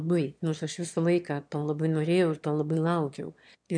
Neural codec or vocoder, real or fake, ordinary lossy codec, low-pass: autoencoder, 22.05 kHz, a latent of 192 numbers a frame, VITS, trained on one speaker; fake; MP3, 64 kbps; 9.9 kHz